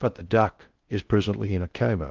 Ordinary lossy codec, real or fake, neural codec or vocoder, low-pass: Opus, 32 kbps; fake; codec, 16 kHz in and 24 kHz out, 0.6 kbps, FocalCodec, streaming, 2048 codes; 7.2 kHz